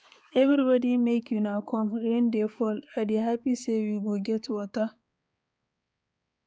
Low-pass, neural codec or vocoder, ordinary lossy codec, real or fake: none; codec, 16 kHz, 4 kbps, X-Codec, HuBERT features, trained on balanced general audio; none; fake